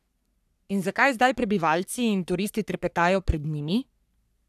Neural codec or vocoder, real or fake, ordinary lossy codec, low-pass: codec, 44.1 kHz, 3.4 kbps, Pupu-Codec; fake; none; 14.4 kHz